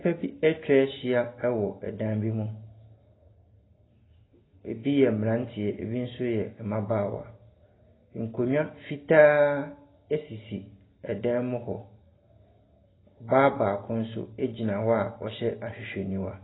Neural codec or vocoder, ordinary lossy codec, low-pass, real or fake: none; AAC, 16 kbps; 7.2 kHz; real